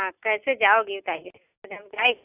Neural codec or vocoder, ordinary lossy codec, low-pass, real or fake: none; AAC, 32 kbps; 3.6 kHz; real